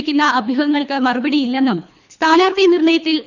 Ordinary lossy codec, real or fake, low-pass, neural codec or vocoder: none; fake; 7.2 kHz; codec, 24 kHz, 3 kbps, HILCodec